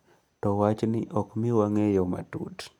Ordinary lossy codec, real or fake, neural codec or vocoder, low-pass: none; real; none; 19.8 kHz